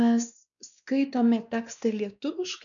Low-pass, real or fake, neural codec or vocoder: 7.2 kHz; fake; codec, 16 kHz, 2 kbps, X-Codec, WavLM features, trained on Multilingual LibriSpeech